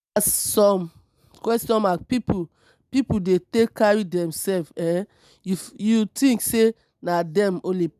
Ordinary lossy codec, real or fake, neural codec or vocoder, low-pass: none; real; none; 14.4 kHz